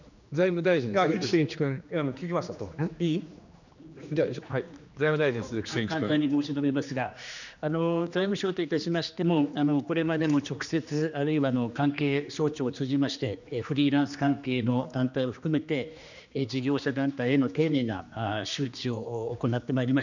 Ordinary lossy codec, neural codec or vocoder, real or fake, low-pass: none; codec, 16 kHz, 2 kbps, X-Codec, HuBERT features, trained on general audio; fake; 7.2 kHz